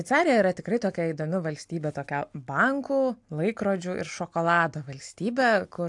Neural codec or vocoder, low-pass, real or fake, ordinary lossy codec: none; 10.8 kHz; real; AAC, 64 kbps